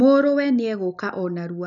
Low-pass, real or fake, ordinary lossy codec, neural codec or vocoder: 7.2 kHz; real; none; none